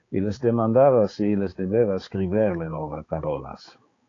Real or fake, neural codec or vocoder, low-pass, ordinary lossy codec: fake; codec, 16 kHz, 4 kbps, X-Codec, HuBERT features, trained on balanced general audio; 7.2 kHz; AAC, 32 kbps